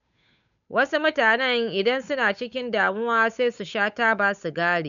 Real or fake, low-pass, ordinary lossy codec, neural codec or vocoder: fake; 7.2 kHz; none; codec, 16 kHz, 8 kbps, FunCodec, trained on Chinese and English, 25 frames a second